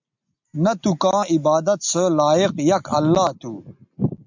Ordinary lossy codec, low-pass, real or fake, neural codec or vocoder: MP3, 64 kbps; 7.2 kHz; real; none